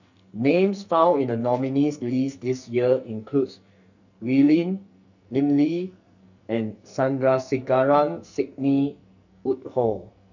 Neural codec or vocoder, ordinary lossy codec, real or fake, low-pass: codec, 44.1 kHz, 2.6 kbps, SNAC; none; fake; 7.2 kHz